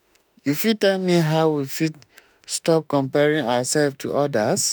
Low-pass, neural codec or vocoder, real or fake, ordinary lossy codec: none; autoencoder, 48 kHz, 32 numbers a frame, DAC-VAE, trained on Japanese speech; fake; none